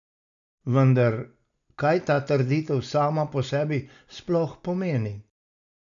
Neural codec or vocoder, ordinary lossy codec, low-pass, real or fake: none; none; 7.2 kHz; real